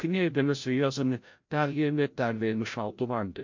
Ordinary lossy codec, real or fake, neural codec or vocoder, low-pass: MP3, 48 kbps; fake; codec, 16 kHz, 0.5 kbps, FreqCodec, larger model; 7.2 kHz